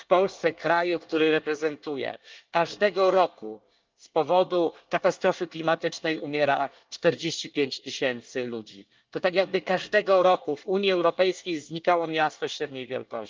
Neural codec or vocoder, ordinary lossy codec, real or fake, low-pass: codec, 24 kHz, 1 kbps, SNAC; Opus, 24 kbps; fake; 7.2 kHz